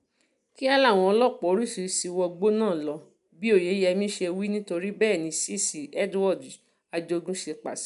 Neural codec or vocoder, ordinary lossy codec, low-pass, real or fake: none; none; 10.8 kHz; real